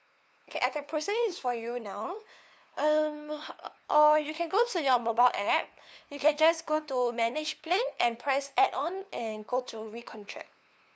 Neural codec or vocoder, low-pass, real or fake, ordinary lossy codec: codec, 16 kHz, 2 kbps, FunCodec, trained on LibriTTS, 25 frames a second; none; fake; none